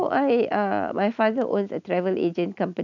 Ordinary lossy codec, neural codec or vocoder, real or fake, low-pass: none; none; real; 7.2 kHz